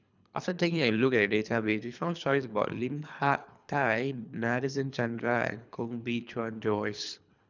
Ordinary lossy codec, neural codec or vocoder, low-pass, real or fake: none; codec, 24 kHz, 3 kbps, HILCodec; 7.2 kHz; fake